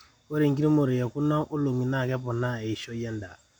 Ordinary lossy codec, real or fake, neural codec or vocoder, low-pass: none; real; none; 19.8 kHz